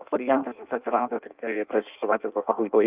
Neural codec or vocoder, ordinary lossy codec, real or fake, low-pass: codec, 16 kHz in and 24 kHz out, 0.6 kbps, FireRedTTS-2 codec; Opus, 24 kbps; fake; 3.6 kHz